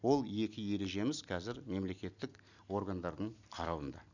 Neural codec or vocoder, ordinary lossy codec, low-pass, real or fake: none; none; 7.2 kHz; real